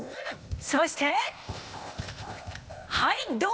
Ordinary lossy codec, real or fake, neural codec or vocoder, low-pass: none; fake; codec, 16 kHz, 0.8 kbps, ZipCodec; none